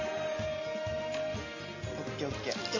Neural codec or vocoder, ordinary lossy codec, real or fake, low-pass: none; MP3, 32 kbps; real; 7.2 kHz